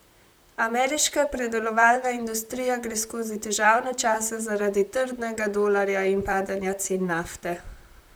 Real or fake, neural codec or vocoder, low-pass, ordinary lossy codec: fake; vocoder, 44.1 kHz, 128 mel bands, Pupu-Vocoder; none; none